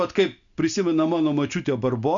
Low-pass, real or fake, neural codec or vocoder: 7.2 kHz; real; none